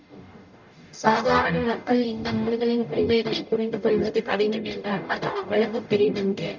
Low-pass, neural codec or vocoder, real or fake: 7.2 kHz; codec, 44.1 kHz, 0.9 kbps, DAC; fake